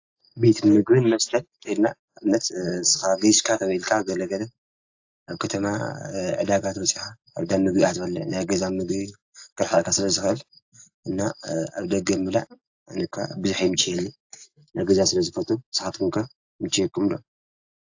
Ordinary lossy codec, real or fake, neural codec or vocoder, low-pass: AAC, 48 kbps; real; none; 7.2 kHz